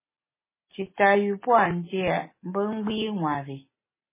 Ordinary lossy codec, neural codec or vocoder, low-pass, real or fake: MP3, 16 kbps; none; 3.6 kHz; real